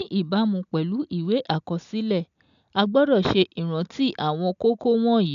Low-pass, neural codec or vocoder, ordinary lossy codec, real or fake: 7.2 kHz; none; none; real